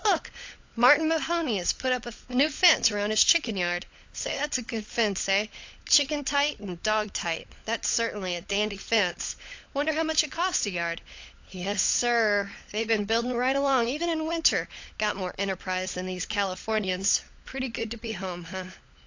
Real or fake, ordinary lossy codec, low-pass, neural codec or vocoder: fake; AAC, 48 kbps; 7.2 kHz; codec, 16 kHz, 16 kbps, FunCodec, trained on LibriTTS, 50 frames a second